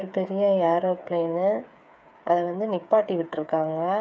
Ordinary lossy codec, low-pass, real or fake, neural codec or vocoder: none; none; fake; codec, 16 kHz, 8 kbps, FreqCodec, smaller model